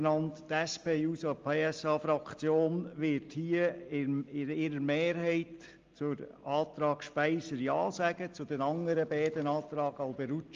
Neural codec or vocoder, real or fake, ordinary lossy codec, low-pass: none; real; Opus, 32 kbps; 7.2 kHz